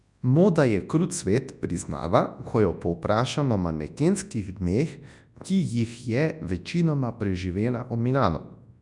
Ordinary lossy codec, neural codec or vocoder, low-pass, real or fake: none; codec, 24 kHz, 0.9 kbps, WavTokenizer, large speech release; 10.8 kHz; fake